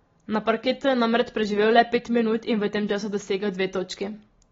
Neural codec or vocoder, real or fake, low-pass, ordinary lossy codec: none; real; 7.2 kHz; AAC, 24 kbps